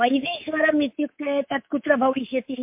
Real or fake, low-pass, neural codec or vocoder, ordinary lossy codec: fake; 3.6 kHz; codec, 24 kHz, 3.1 kbps, DualCodec; MP3, 32 kbps